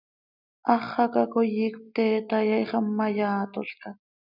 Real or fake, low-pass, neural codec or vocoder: real; 5.4 kHz; none